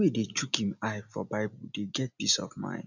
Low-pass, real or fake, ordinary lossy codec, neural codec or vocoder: 7.2 kHz; real; none; none